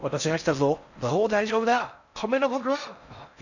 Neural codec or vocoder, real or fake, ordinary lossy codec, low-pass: codec, 16 kHz in and 24 kHz out, 0.6 kbps, FocalCodec, streaming, 4096 codes; fake; none; 7.2 kHz